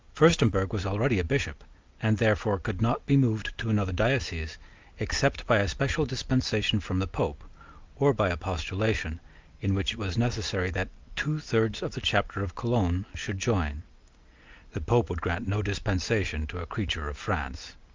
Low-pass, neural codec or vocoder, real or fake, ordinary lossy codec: 7.2 kHz; none; real; Opus, 24 kbps